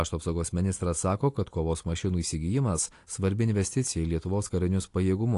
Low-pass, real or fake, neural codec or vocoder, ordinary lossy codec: 10.8 kHz; real; none; AAC, 48 kbps